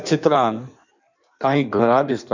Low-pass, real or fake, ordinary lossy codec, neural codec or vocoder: 7.2 kHz; fake; none; codec, 16 kHz in and 24 kHz out, 1.1 kbps, FireRedTTS-2 codec